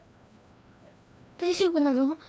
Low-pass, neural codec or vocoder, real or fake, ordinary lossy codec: none; codec, 16 kHz, 1 kbps, FreqCodec, larger model; fake; none